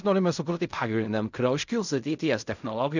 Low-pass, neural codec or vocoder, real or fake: 7.2 kHz; codec, 16 kHz in and 24 kHz out, 0.4 kbps, LongCat-Audio-Codec, fine tuned four codebook decoder; fake